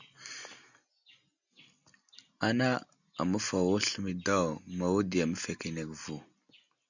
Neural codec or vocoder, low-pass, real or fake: none; 7.2 kHz; real